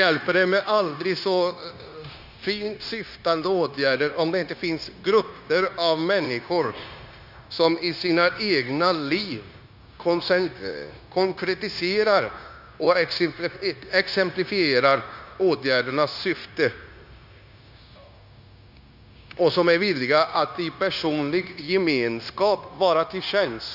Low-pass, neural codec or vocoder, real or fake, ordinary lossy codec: 5.4 kHz; codec, 16 kHz, 0.9 kbps, LongCat-Audio-Codec; fake; Opus, 64 kbps